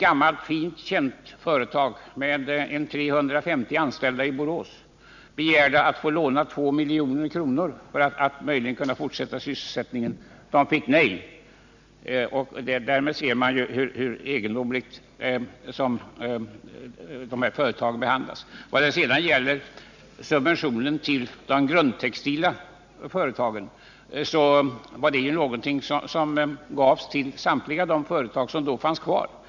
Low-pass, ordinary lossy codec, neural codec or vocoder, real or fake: 7.2 kHz; none; none; real